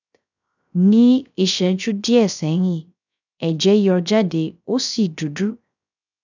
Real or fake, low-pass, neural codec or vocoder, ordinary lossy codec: fake; 7.2 kHz; codec, 16 kHz, 0.3 kbps, FocalCodec; none